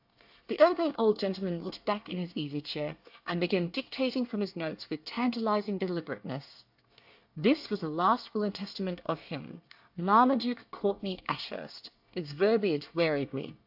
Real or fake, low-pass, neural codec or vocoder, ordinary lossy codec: fake; 5.4 kHz; codec, 24 kHz, 1 kbps, SNAC; AAC, 48 kbps